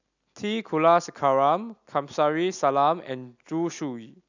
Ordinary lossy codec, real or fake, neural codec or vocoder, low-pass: none; real; none; 7.2 kHz